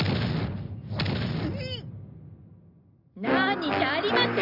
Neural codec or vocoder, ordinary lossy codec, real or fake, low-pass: none; none; real; 5.4 kHz